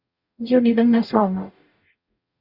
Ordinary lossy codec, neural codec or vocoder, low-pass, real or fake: Opus, 64 kbps; codec, 44.1 kHz, 0.9 kbps, DAC; 5.4 kHz; fake